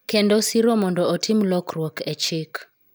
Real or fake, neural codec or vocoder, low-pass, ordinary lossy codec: real; none; none; none